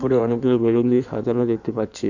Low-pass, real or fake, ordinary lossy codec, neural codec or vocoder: 7.2 kHz; fake; none; codec, 16 kHz in and 24 kHz out, 1.1 kbps, FireRedTTS-2 codec